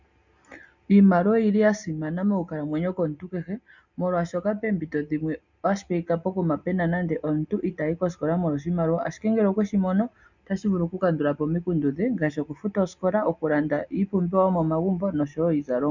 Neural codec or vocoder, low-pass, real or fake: none; 7.2 kHz; real